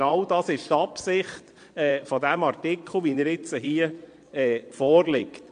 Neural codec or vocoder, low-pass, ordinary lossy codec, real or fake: vocoder, 22.05 kHz, 80 mel bands, Vocos; 9.9 kHz; AAC, 64 kbps; fake